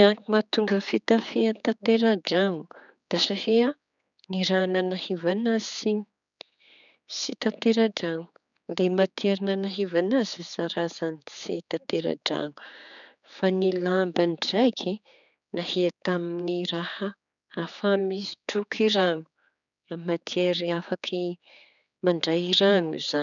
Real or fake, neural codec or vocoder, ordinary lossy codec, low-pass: fake; codec, 16 kHz, 4 kbps, X-Codec, HuBERT features, trained on general audio; none; 7.2 kHz